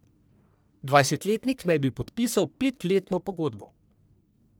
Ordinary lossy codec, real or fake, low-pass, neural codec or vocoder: none; fake; none; codec, 44.1 kHz, 1.7 kbps, Pupu-Codec